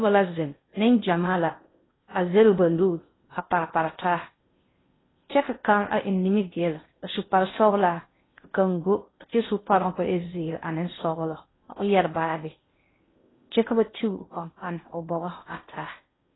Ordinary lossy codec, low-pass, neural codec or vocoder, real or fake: AAC, 16 kbps; 7.2 kHz; codec, 16 kHz in and 24 kHz out, 0.6 kbps, FocalCodec, streaming, 2048 codes; fake